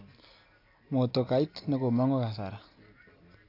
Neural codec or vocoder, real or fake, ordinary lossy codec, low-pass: none; real; AAC, 32 kbps; 5.4 kHz